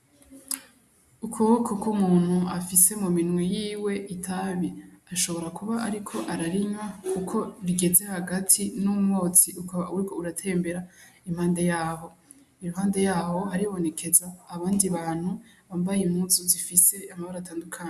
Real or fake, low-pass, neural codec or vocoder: real; 14.4 kHz; none